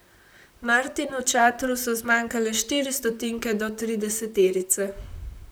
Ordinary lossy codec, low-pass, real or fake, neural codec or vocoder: none; none; fake; vocoder, 44.1 kHz, 128 mel bands, Pupu-Vocoder